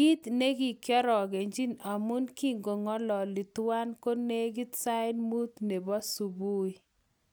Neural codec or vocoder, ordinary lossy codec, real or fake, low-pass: none; none; real; none